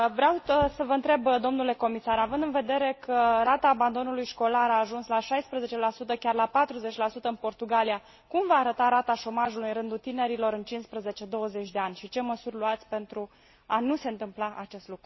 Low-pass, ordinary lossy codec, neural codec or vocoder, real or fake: 7.2 kHz; MP3, 24 kbps; none; real